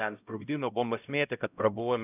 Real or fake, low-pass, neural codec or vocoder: fake; 3.6 kHz; codec, 16 kHz, 0.5 kbps, X-Codec, HuBERT features, trained on LibriSpeech